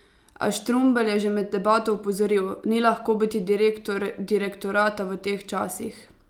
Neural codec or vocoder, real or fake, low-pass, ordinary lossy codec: none; real; 19.8 kHz; Opus, 32 kbps